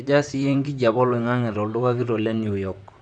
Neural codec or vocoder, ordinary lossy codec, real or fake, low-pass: vocoder, 44.1 kHz, 128 mel bands, Pupu-Vocoder; none; fake; 9.9 kHz